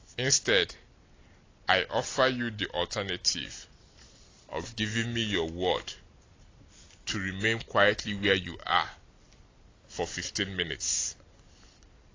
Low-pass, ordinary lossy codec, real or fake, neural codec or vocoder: 7.2 kHz; AAC, 32 kbps; real; none